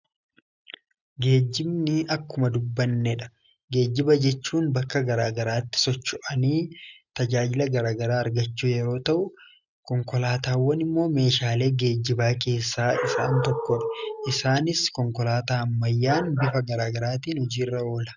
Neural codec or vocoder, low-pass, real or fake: none; 7.2 kHz; real